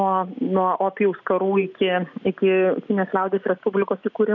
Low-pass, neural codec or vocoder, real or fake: 7.2 kHz; none; real